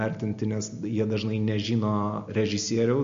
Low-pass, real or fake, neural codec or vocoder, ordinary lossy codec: 7.2 kHz; real; none; MP3, 48 kbps